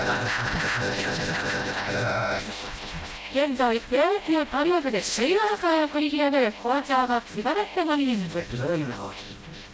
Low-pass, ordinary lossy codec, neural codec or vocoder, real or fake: none; none; codec, 16 kHz, 0.5 kbps, FreqCodec, smaller model; fake